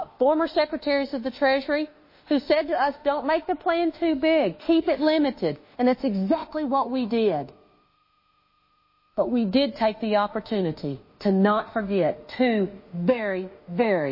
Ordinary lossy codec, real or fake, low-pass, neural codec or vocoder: MP3, 24 kbps; fake; 5.4 kHz; autoencoder, 48 kHz, 32 numbers a frame, DAC-VAE, trained on Japanese speech